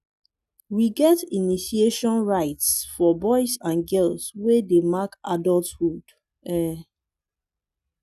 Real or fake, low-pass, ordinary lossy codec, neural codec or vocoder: real; 14.4 kHz; none; none